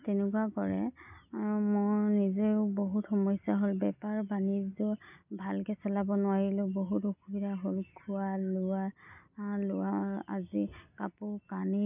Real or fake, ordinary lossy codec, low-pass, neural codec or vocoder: real; none; 3.6 kHz; none